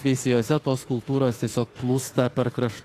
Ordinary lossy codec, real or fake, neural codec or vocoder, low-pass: AAC, 48 kbps; fake; autoencoder, 48 kHz, 32 numbers a frame, DAC-VAE, trained on Japanese speech; 14.4 kHz